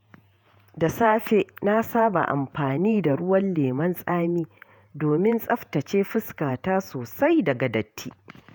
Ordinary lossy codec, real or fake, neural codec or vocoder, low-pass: none; fake; vocoder, 48 kHz, 128 mel bands, Vocos; none